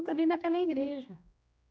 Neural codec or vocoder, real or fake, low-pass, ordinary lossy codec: codec, 16 kHz, 1 kbps, X-Codec, HuBERT features, trained on general audio; fake; none; none